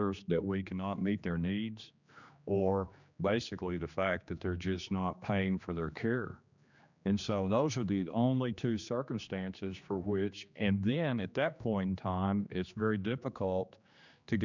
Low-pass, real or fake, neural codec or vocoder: 7.2 kHz; fake; codec, 16 kHz, 2 kbps, X-Codec, HuBERT features, trained on general audio